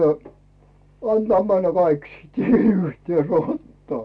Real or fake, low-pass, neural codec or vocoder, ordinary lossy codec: real; none; none; none